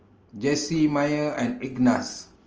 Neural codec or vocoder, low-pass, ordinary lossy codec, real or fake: none; 7.2 kHz; Opus, 24 kbps; real